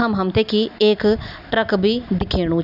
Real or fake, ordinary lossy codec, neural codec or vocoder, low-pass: real; none; none; 5.4 kHz